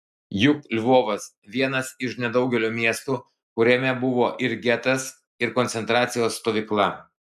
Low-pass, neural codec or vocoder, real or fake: 14.4 kHz; none; real